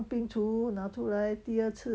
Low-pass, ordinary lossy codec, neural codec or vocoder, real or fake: none; none; none; real